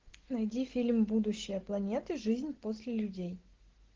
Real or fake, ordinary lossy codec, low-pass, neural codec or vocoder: real; Opus, 16 kbps; 7.2 kHz; none